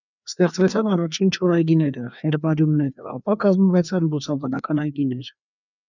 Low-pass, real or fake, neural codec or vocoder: 7.2 kHz; fake; codec, 16 kHz, 2 kbps, FreqCodec, larger model